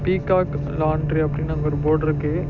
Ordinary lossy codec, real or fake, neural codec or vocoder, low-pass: none; real; none; 7.2 kHz